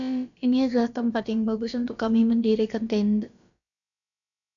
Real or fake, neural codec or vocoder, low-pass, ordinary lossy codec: fake; codec, 16 kHz, about 1 kbps, DyCAST, with the encoder's durations; 7.2 kHz; MP3, 96 kbps